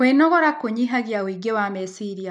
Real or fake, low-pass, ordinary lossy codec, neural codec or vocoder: real; 9.9 kHz; none; none